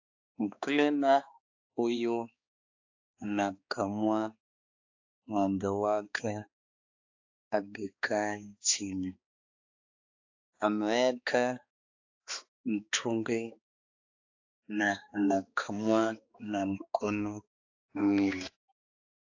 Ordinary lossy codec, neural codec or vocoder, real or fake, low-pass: AAC, 48 kbps; codec, 16 kHz, 2 kbps, X-Codec, HuBERT features, trained on balanced general audio; fake; 7.2 kHz